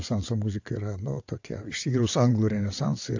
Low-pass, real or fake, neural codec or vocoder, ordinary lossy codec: 7.2 kHz; fake; vocoder, 22.05 kHz, 80 mel bands, WaveNeXt; AAC, 48 kbps